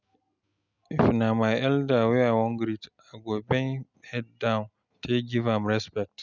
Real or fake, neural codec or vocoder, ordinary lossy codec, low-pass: real; none; none; 7.2 kHz